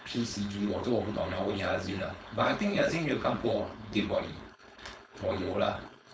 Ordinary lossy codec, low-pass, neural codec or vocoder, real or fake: none; none; codec, 16 kHz, 4.8 kbps, FACodec; fake